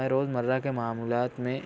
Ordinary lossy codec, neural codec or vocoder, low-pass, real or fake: none; none; none; real